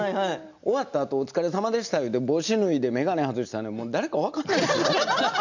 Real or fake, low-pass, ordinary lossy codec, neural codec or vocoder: real; 7.2 kHz; none; none